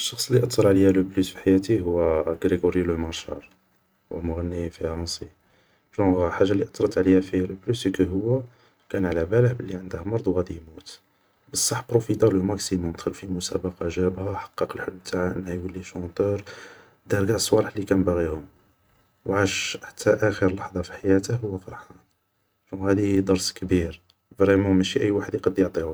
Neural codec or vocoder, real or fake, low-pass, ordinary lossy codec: vocoder, 44.1 kHz, 128 mel bands every 512 samples, BigVGAN v2; fake; none; none